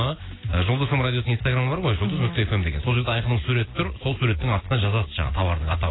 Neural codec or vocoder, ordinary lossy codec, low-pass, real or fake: none; AAC, 16 kbps; 7.2 kHz; real